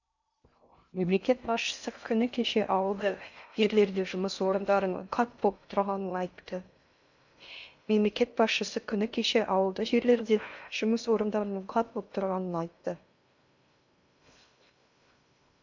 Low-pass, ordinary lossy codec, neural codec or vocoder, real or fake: 7.2 kHz; none; codec, 16 kHz in and 24 kHz out, 0.6 kbps, FocalCodec, streaming, 2048 codes; fake